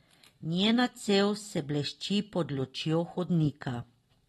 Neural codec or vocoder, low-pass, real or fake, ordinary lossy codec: none; 14.4 kHz; real; AAC, 32 kbps